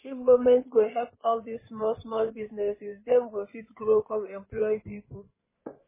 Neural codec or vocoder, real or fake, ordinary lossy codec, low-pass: codec, 16 kHz in and 24 kHz out, 2.2 kbps, FireRedTTS-2 codec; fake; MP3, 16 kbps; 3.6 kHz